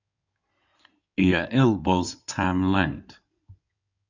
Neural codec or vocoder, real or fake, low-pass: codec, 16 kHz in and 24 kHz out, 2.2 kbps, FireRedTTS-2 codec; fake; 7.2 kHz